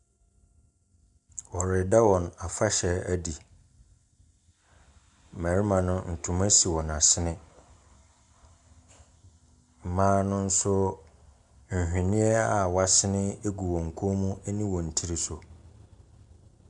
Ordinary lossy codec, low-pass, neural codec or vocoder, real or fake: MP3, 96 kbps; 10.8 kHz; none; real